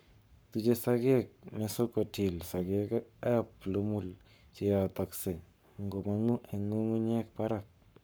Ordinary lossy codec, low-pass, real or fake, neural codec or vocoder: none; none; fake; codec, 44.1 kHz, 7.8 kbps, Pupu-Codec